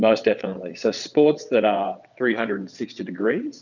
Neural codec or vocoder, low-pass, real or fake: vocoder, 22.05 kHz, 80 mel bands, WaveNeXt; 7.2 kHz; fake